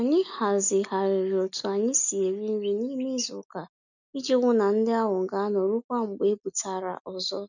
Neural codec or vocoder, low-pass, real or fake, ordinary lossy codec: none; 7.2 kHz; real; none